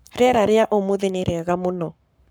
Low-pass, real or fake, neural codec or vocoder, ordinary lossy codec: none; fake; codec, 44.1 kHz, 7.8 kbps, Pupu-Codec; none